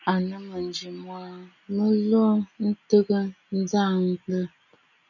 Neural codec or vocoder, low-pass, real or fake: none; 7.2 kHz; real